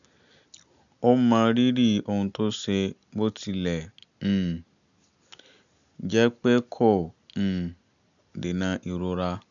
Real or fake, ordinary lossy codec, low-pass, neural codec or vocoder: real; none; 7.2 kHz; none